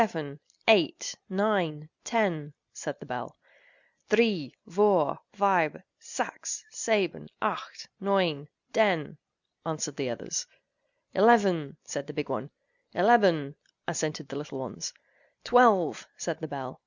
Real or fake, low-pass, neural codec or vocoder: real; 7.2 kHz; none